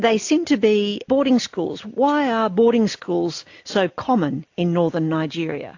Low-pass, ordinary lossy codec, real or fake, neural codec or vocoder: 7.2 kHz; AAC, 48 kbps; real; none